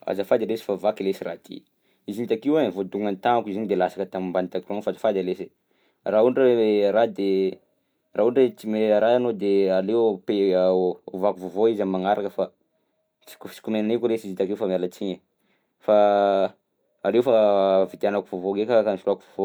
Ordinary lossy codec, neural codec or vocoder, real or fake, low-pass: none; none; real; none